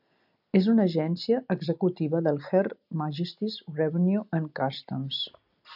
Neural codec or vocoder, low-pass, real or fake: none; 5.4 kHz; real